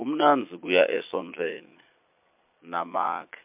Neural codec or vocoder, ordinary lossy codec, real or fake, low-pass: vocoder, 22.05 kHz, 80 mel bands, Vocos; MP3, 32 kbps; fake; 3.6 kHz